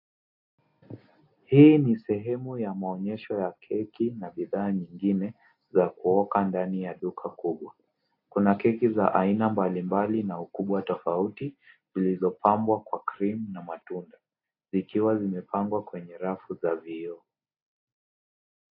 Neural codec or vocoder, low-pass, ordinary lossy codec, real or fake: none; 5.4 kHz; AAC, 32 kbps; real